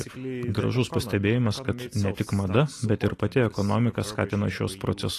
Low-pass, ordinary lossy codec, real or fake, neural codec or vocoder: 14.4 kHz; AAC, 48 kbps; real; none